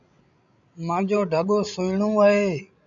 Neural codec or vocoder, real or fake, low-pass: codec, 16 kHz, 16 kbps, FreqCodec, larger model; fake; 7.2 kHz